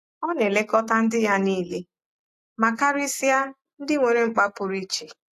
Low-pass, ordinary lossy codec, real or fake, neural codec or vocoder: 14.4 kHz; AAC, 64 kbps; real; none